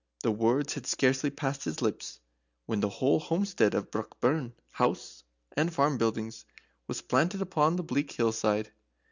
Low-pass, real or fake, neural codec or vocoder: 7.2 kHz; real; none